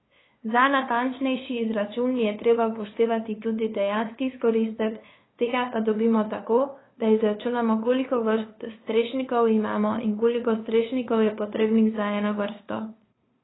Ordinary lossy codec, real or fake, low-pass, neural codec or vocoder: AAC, 16 kbps; fake; 7.2 kHz; codec, 16 kHz, 2 kbps, FunCodec, trained on LibriTTS, 25 frames a second